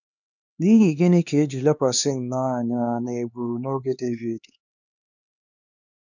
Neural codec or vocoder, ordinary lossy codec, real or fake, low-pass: codec, 16 kHz, 2 kbps, X-Codec, HuBERT features, trained on LibriSpeech; none; fake; 7.2 kHz